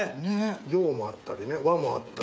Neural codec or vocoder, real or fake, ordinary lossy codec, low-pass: codec, 16 kHz, 8 kbps, FreqCodec, smaller model; fake; none; none